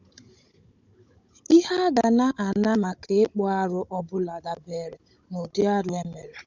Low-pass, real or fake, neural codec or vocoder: 7.2 kHz; fake; codec, 16 kHz, 16 kbps, FunCodec, trained on Chinese and English, 50 frames a second